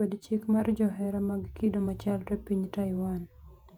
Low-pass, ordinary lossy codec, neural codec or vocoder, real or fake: 19.8 kHz; none; vocoder, 48 kHz, 128 mel bands, Vocos; fake